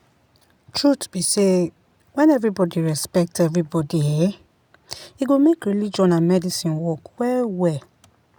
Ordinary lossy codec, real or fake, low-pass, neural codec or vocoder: none; real; none; none